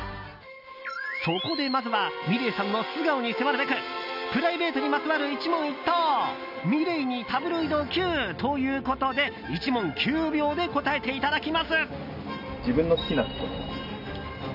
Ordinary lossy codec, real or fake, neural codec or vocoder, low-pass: none; real; none; 5.4 kHz